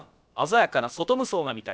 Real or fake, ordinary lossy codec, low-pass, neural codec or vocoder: fake; none; none; codec, 16 kHz, about 1 kbps, DyCAST, with the encoder's durations